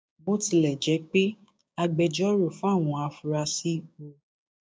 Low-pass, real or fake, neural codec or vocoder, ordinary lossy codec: none; fake; codec, 16 kHz, 6 kbps, DAC; none